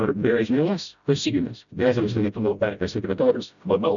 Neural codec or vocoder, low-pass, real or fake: codec, 16 kHz, 0.5 kbps, FreqCodec, smaller model; 7.2 kHz; fake